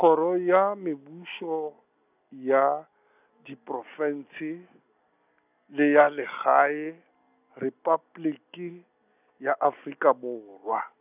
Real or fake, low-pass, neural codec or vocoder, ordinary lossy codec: real; 3.6 kHz; none; none